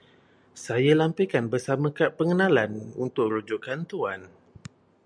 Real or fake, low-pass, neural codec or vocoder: real; 9.9 kHz; none